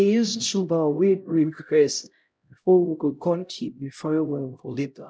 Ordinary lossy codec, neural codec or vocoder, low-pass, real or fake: none; codec, 16 kHz, 0.5 kbps, X-Codec, HuBERT features, trained on LibriSpeech; none; fake